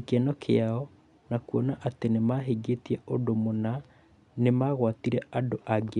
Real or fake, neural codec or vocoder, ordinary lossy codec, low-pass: real; none; none; 10.8 kHz